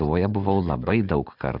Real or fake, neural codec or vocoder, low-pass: real; none; 5.4 kHz